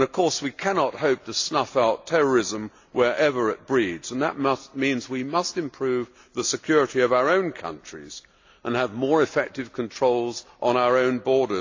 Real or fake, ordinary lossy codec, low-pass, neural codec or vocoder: real; AAC, 48 kbps; 7.2 kHz; none